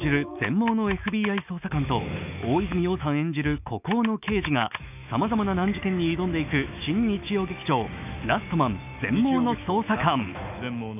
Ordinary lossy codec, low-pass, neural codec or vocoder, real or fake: none; 3.6 kHz; autoencoder, 48 kHz, 128 numbers a frame, DAC-VAE, trained on Japanese speech; fake